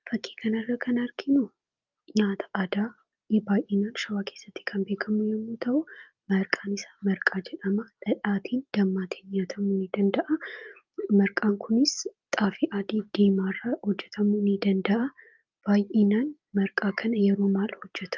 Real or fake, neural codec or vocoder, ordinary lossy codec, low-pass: real; none; Opus, 24 kbps; 7.2 kHz